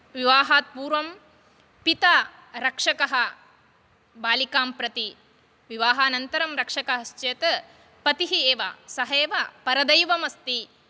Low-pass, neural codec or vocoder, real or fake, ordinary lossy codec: none; none; real; none